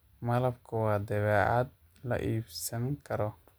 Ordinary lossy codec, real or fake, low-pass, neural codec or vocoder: none; real; none; none